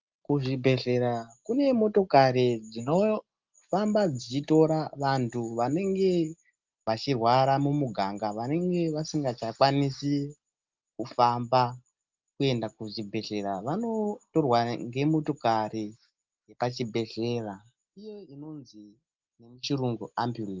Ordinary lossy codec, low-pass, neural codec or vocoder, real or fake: Opus, 32 kbps; 7.2 kHz; none; real